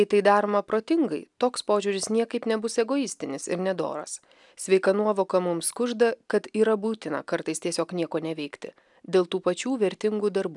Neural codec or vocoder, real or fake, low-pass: none; real; 10.8 kHz